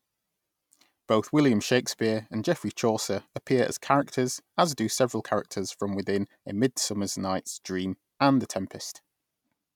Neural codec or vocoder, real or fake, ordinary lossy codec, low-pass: none; real; none; 19.8 kHz